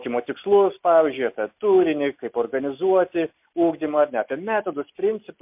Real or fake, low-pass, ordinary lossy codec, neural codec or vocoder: real; 3.6 kHz; MP3, 32 kbps; none